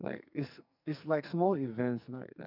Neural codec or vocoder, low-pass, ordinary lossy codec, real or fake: codec, 44.1 kHz, 2.6 kbps, SNAC; 5.4 kHz; none; fake